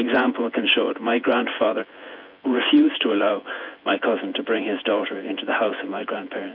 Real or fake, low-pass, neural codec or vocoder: fake; 5.4 kHz; vocoder, 24 kHz, 100 mel bands, Vocos